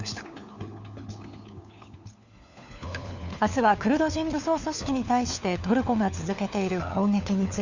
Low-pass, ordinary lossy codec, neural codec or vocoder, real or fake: 7.2 kHz; none; codec, 16 kHz, 4 kbps, FunCodec, trained on LibriTTS, 50 frames a second; fake